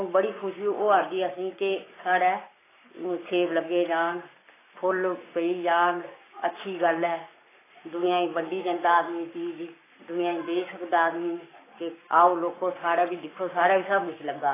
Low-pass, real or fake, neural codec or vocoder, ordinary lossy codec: 3.6 kHz; fake; codec, 44.1 kHz, 7.8 kbps, Pupu-Codec; AAC, 16 kbps